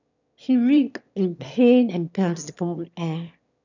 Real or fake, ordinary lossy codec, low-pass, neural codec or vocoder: fake; none; 7.2 kHz; autoencoder, 22.05 kHz, a latent of 192 numbers a frame, VITS, trained on one speaker